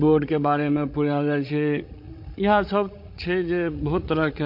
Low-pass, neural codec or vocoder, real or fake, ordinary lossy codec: 5.4 kHz; codec, 16 kHz, 16 kbps, FreqCodec, larger model; fake; MP3, 32 kbps